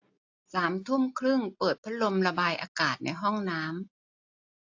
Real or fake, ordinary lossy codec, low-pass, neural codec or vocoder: real; AAC, 48 kbps; 7.2 kHz; none